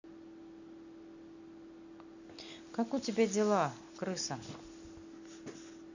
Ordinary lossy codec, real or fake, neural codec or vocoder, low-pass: none; real; none; 7.2 kHz